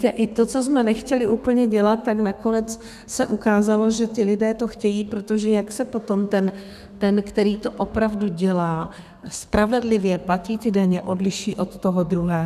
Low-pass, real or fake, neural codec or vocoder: 14.4 kHz; fake; codec, 32 kHz, 1.9 kbps, SNAC